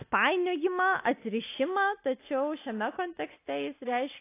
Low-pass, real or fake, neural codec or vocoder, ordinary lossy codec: 3.6 kHz; real; none; AAC, 24 kbps